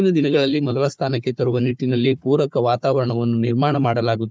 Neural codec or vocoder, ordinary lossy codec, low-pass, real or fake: codec, 16 kHz, 4 kbps, FunCodec, trained on Chinese and English, 50 frames a second; none; none; fake